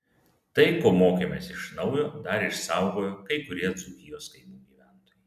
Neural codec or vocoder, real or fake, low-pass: none; real; 14.4 kHz